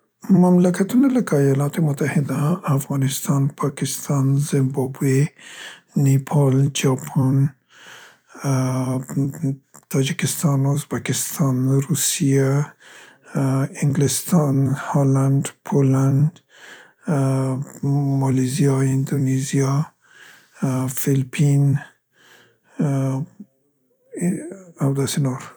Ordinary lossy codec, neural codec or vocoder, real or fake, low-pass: none; vocoder, 44.1 kHz, 128 mel bands every 256 samples, BigVGAN v2; fake; none